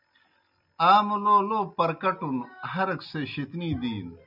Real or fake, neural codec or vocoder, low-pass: real; none; 5.4 kHz